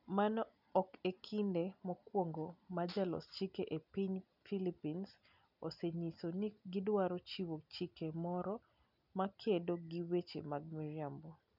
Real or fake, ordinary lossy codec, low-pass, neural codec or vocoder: real; none; 5.4 kHz; none